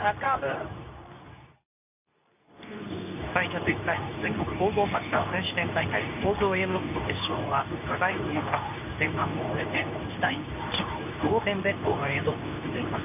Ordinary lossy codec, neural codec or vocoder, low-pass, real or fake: none; codec, 24 kHz, 0.9 kbps, WavTokenizer, medium speech release version 2; 3.6 kHz; fake